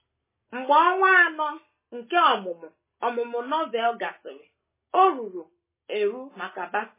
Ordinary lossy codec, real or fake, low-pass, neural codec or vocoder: MP3, 16 kbps; real; 3.6 kHz; none